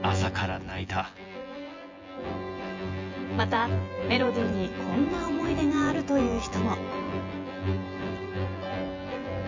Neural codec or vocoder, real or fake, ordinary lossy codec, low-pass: vocoder, 24 kHz, 100 mel bands, Vocos; fake; MP3, 64 kbps; 7.2 kHz